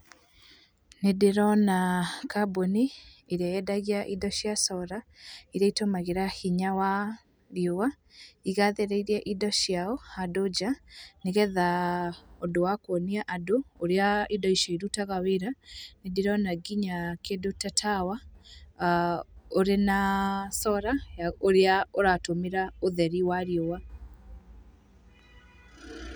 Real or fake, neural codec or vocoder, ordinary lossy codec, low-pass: real; none; none; none